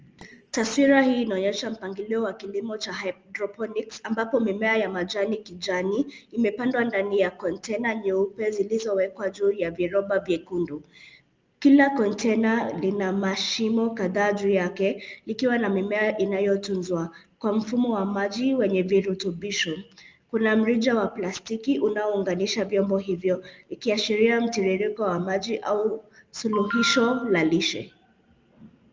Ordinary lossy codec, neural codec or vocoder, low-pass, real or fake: Opus, 24 kbps; none; 7.2 kHz; real